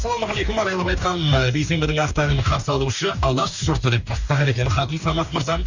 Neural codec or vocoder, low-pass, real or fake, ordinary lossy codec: codec, 44.1 kHz, 2.6 kbps, DAC; 7.2 kHz; fake; Opus, 64 kbps